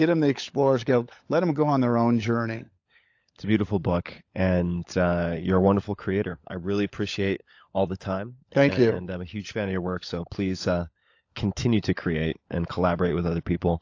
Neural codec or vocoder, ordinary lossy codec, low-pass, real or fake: codec, 16 kHz, 16 kbps, FunCodec, trained on LibriTTS, 50 frames a second; AAC, 48 kbps; 7.2 kHz; fake